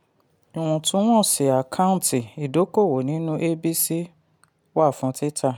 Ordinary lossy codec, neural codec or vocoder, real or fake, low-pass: none; none; real; none